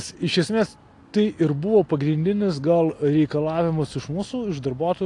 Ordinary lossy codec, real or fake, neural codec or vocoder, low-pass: AAC, 48 kbps; real; none; 10.8 kHz